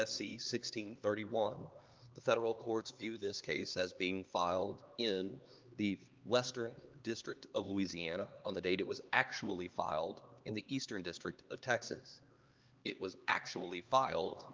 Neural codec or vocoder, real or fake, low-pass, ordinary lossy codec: codec, 16 kHz, 2 kbps, X-Codec, HuBERT features, trained on LibriSpeech; fake; 7.2 kHz; Opus, 32 kbps